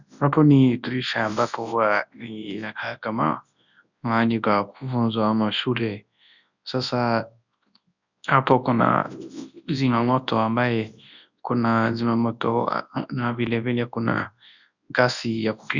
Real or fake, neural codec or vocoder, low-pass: fake; codec, 24 kHz, 0.9 kbps, WavTokenizer, large speech release; 7.2 kHz